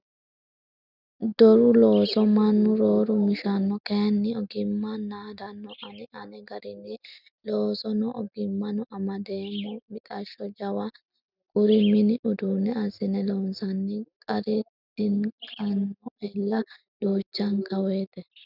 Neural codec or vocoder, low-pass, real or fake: none; 5.4 kHz; real